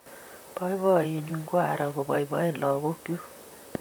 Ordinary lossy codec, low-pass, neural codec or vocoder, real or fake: none; none; vocoder, 44.1 kHz, 128 mel bands, Pupu-Vocoder; fake